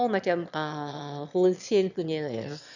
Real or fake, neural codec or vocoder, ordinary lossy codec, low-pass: fake; autoencoder, 22.05 kHz, a latent of 192 numbers a frame, VITS, trained on one speaker; none; 7.2 kHz